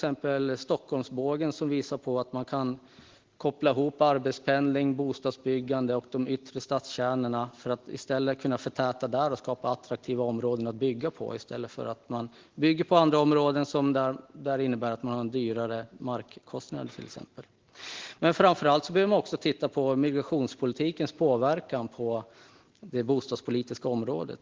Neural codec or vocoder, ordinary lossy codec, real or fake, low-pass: none; Opus, 16 kbps; real; 7.2 kHz